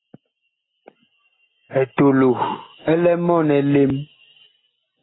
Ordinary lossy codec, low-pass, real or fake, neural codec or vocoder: AAC, 16 kbps; 7.2 kHz; real; none